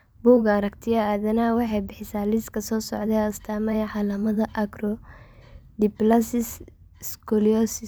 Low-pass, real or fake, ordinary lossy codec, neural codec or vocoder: none; fake; none; vocoder, 44.1 kHz, 128 mel bands every 512 samples, BigVGAN v2